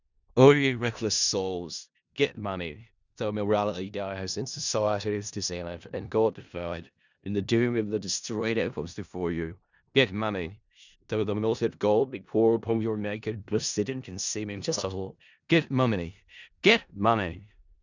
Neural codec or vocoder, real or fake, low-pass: codec, 16 kHz in and 24 kHz out, 0.4 kbps, LongCat-Audio-Codec, four codebook decoder; fake; 7.2 kHz